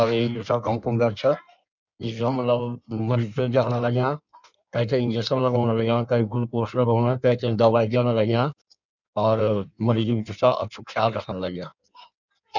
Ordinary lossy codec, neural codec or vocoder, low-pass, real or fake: none; codec, 16 kHz in and 24 kHz out, 1.1 kbps, FireRedTTS-2 codec; 7.2 kHz; fake